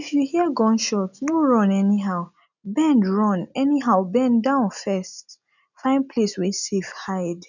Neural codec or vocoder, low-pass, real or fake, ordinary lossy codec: none; 7.2 kHz; real; none